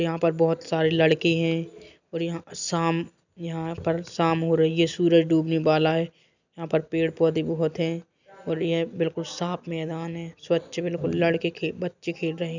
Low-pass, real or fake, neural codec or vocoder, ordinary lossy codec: 7.2 kHz; real; none; none